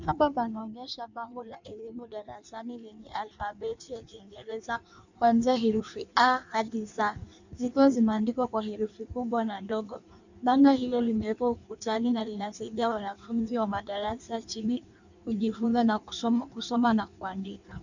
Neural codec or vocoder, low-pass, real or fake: codec, 16 kHz in and 24 kHz out, 1.1 kbps, FireRedTTS-2 codec; 7.2 kHz; fake